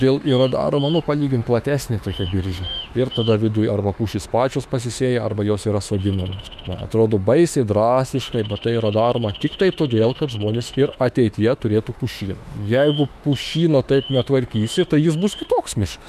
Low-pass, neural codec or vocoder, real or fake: 14.4 kHz; autoencoder, 48 kHz, 32 numbers a frame, DAC-VAE, trained on Japanese speech; fake